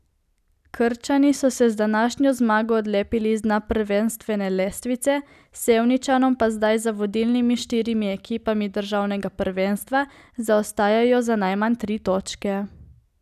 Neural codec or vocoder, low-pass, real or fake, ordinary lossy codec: none; 14.4 kHz; real; none